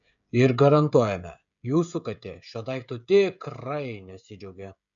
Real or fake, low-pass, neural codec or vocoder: fake; 7.2 kHz; codec, 16 kHz, 16 kbps, FreqCodec, smaller model